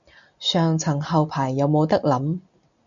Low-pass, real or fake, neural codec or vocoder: 7.2 kHz; real; none